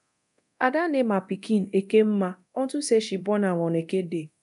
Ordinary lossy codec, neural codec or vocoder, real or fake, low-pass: none; codec, 24 kHz, 0.9 kbps, DualCodec; fake; 10.8 kHz